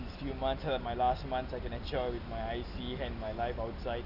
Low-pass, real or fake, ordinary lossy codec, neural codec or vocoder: 5.4 kHz; real; none; none